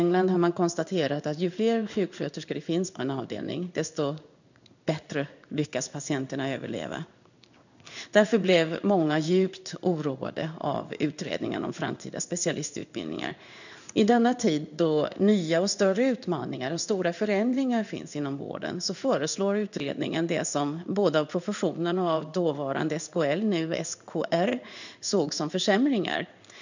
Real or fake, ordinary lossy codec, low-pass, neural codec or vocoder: fake; none; 7.2 kHz; codec, 16 kHz in and 24 kHz out, 1 kbps, XY-Tokenizer